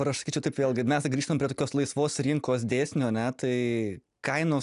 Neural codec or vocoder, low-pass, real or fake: none; 10.8 kHz; real